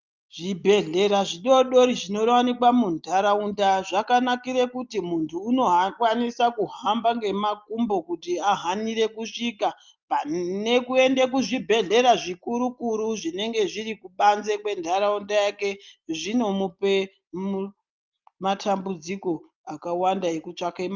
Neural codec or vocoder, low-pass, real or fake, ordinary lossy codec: none; 7.2 kHz; real; Opus, 24 kbps